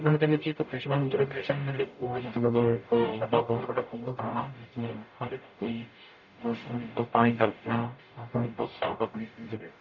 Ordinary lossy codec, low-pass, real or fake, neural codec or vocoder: none; 7.2 kHz; fake; codec, 44.1 kHz, 0.9 kbps, DAC